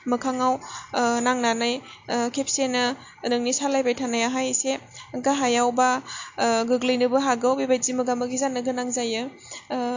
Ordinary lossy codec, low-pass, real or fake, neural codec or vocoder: AAC, 48 kbps; 7.2 kHz; real; none